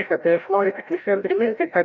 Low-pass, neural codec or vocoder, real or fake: 7.2 kHz; codec, 16 kHz, 0.5 kbps, FreqCodec, larger model; fake